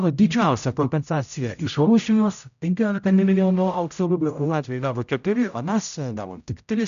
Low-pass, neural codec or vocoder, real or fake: 7.2 kHz; codec, 16 kHz, 0.5 kbps, X-Codec, HuBERT features, trained on general audio; fake